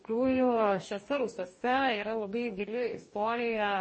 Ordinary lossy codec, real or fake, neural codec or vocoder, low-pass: MP3, 32 kbps; fake; codec, 44.1 kHz, 2.6 kbps, DAC; 9.9 kHz